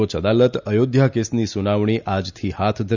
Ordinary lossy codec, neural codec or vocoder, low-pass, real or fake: none; none; 7.2 kHz; real